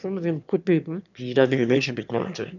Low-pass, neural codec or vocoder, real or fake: 7.2 kHz; autoencoder, 22.05 kHz, a latent of 192 numbers a frame, VITS, trained on one speaker; fake